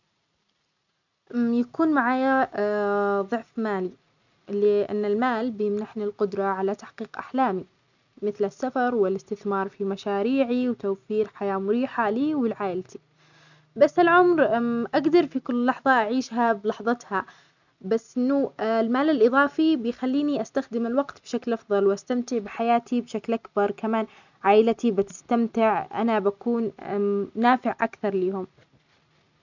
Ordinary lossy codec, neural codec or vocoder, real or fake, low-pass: none; none; real; 7.2 kHz